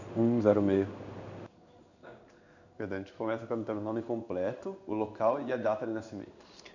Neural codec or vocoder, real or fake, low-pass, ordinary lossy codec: codec, 16 kHz in and 24 kHz out, 1 kbps, XY-Tokenizer; fake; 7.2 kHz; none